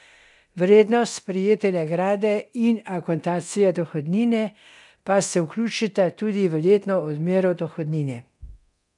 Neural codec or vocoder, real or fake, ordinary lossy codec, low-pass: codec, 24 kHz, 0.9 kbps, DualCodec; fake; none; 10.8 kHz